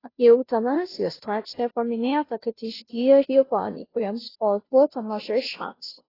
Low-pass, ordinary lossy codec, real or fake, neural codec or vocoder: 5.4 kHz; AAC, 24 kbps; fake; codec, 16 kHz, 0.5 kbps, FunCodec, trained on Chinese and English, 25 frames a second